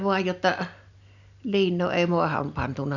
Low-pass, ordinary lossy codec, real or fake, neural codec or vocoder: 7.2 kHz; none; real; none